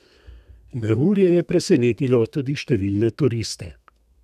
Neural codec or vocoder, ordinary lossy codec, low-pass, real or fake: codec, 32 kHz, 1.9 kbps, SNAC; none; 14.4 kHz; fake